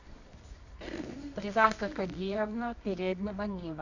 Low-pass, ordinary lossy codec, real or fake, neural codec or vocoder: 7.2 kHz; none; fake; codec, 24 kHz, 0.9 kbps, WavTokenizer, medium music audio release